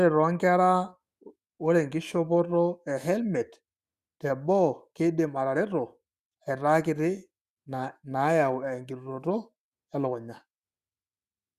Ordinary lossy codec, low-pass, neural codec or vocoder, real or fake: Opus, 64 kbps; 14.4 kHz; autoencoder, 48 kHz, 128 numbers a frame, DAC-VAE, trained on Japanese speech; fake